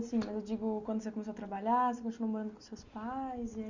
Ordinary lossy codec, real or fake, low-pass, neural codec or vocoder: Opus, 64 kbps; real; 7.2 kHz; none